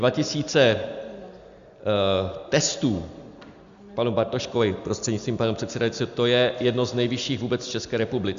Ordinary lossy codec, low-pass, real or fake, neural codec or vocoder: Opus, 64 kbps; 7.2 kHz; real; none